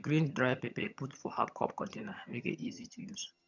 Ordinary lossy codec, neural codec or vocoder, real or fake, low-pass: none; vocoder, 22.05 kHz, 80 mel bands, HiFi-GAN; fake; 7.2 kHz